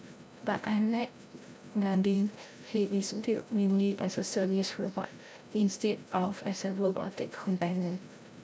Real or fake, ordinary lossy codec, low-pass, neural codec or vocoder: fake; none; none; codec, 16 kHz, 0.5 kbps, FreqCodec, larger model